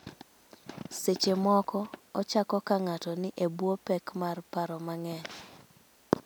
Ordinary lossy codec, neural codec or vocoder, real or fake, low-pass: none; none; real; none